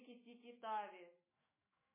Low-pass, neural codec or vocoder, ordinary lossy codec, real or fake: 3.6 kHz; autoencoder, 48 kHz, 128 numbers a frame, DAC-VAE, trained on Japanese speech; MP3, 16 kbps; fake